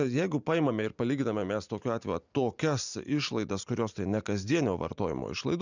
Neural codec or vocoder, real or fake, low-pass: vocoder, 44.1 kHz, 128 mel bands every 512 samples, BigVGAN v2; fake; 7.2 kHz